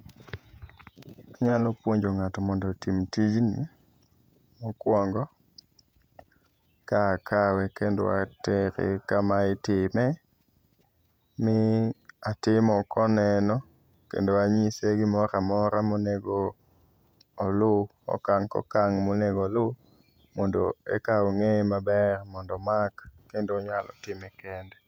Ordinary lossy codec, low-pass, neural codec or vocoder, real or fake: none; 19.8 kHz; vocoder, 48 kHz, 128 mel bands, Vocos; fake